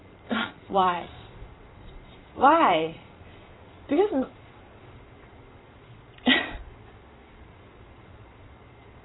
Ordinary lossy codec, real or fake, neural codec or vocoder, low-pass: AAC, 16 kbps; fake; vocoder, 22.05 kHz, 80 mel bands, Vocos; 7.2 kHz